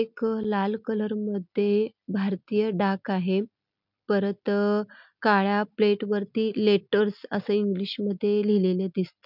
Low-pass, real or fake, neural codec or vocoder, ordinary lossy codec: 5.4 kHz; real; none; none